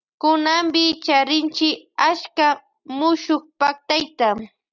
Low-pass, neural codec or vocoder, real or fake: 7.2 kHz; none; real